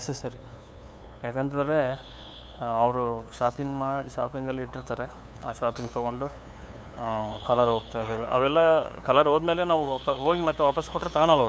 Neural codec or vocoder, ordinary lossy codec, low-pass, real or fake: codec, 16 kHz, 2 kbps, FunCodec, trained on LibriTTS, 25 frames a second; none; none; fake